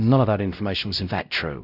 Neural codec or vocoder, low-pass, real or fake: codec, 16 kHz, 0.5 kbps, X-Codec, WavLM features, trained on Multilingual LibriSpeech; 5.4 kHz; fake